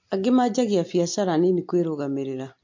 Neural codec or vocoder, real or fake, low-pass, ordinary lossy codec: none; real; 7.2 kHz; MP3, 48 kbps